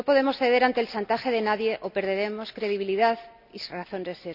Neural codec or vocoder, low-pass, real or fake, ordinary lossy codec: none; 5.4 kHz; real; none